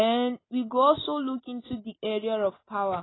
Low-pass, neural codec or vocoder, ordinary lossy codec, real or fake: 7.2 kHz; none; AAC, 16 kbps; real